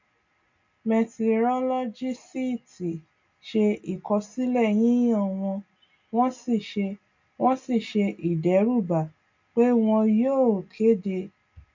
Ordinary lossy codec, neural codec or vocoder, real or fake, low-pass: MP3, 48 kbps; none; real; 7.2 kHz